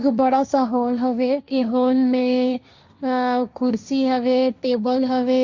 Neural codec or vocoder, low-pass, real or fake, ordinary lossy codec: codec, 16 kHz, 1.1 kbps, Voila-Tokenizer; 7.2 kHz; fake; Opus, 64 kbps